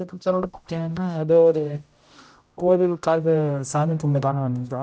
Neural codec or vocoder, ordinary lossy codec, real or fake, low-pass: codec, 16 kHz, 0.5 kbps, X-Codec, HuBERT features, trained on general audio; none; fake; none